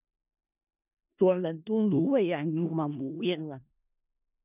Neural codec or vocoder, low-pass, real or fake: codec, 16 kHz in and 24 kHz out, 0.4 kbps, LongCat-Audio-Codec, four codebook decoder; 3.6 kHz; fake